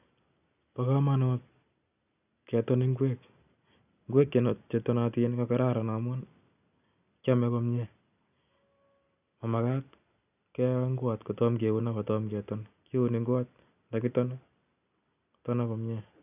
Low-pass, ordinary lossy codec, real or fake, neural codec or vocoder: 3.6 kHz; none; real; none